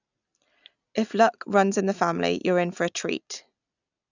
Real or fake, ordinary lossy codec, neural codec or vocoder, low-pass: real; none; none; 7.2 kHz